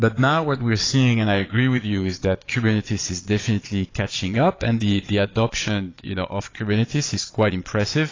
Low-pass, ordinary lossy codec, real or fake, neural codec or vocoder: 7.2 kHz; AAC, 32 kbps; fake; vocoder, 22.05 kHz, 80 mel bands, Vocos